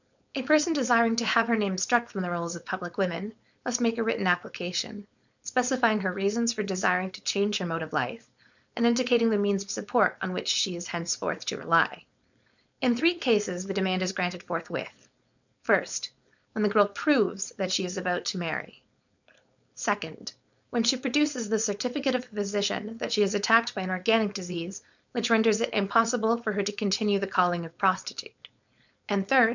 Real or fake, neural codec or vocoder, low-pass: fake; codec, 16 kHz, 4.8 kbps, FACodec; 7.2 kHz